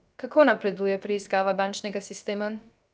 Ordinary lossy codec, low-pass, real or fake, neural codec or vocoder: none; none; fake; codec, 16 kHz, 0.7 kbps, FocalCodec